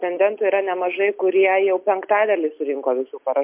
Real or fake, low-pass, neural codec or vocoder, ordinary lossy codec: real; 3.6 kHz; none; MP3, 32 kbps